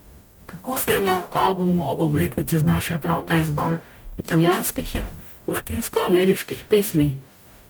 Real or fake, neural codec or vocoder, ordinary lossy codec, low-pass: fake; codec, 44.1 kHz, 0.9 kbps, DAC; none; none